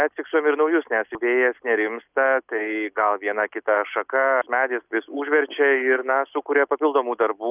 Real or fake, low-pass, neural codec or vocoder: real; 3.6 kHz; none